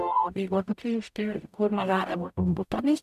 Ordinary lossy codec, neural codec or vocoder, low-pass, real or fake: none; codec, 44.1 kHz, 0.9 kbps, DAC; 14.4 kHz; fake